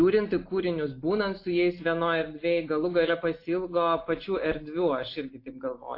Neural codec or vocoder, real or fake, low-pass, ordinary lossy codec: none; real; 5.4 kHz; AAC, 32 kbps